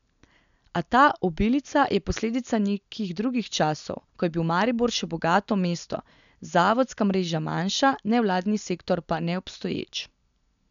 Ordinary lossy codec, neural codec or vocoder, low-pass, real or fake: none; none; 7.2 kHz; real